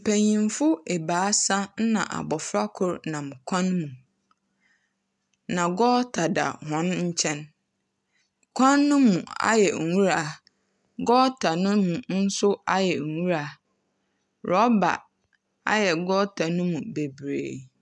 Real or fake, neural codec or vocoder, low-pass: real; none; 10.8 kHz